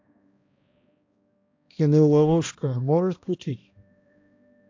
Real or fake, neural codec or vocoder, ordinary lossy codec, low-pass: fake; codec, 16 kHz, 1 kbps, X-Codec, HuBERT features, trained on balanced general audio; AAC, 48 kbps; 7.2 kHz